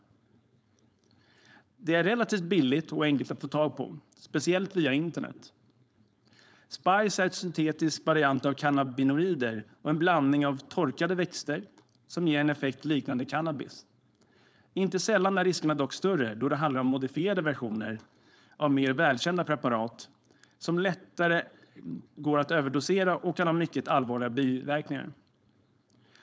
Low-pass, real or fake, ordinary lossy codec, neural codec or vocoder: none; fake; none; codec, 16 kHz, 4.8 kbps, FACodec